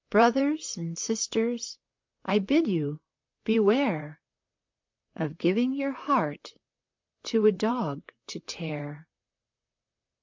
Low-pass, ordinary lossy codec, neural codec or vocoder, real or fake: 7.2 kHz; MP3, 64 kbps; vocoder, 44.1 kHz, 128 mel bands, Pupu-Vocoder; fake